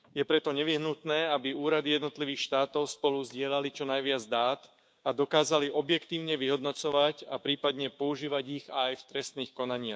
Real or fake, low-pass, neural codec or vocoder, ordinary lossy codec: fake; none; codec, 16 kHz, 6 kbps, DAC; none